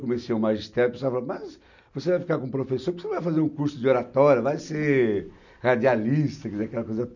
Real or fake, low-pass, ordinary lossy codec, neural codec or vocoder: real; 7.2 kHz; none; none